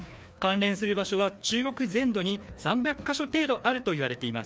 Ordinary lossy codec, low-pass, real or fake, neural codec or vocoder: none; none; fake; codec, 16 kHz, 2 kbps, FreqCodec, larger model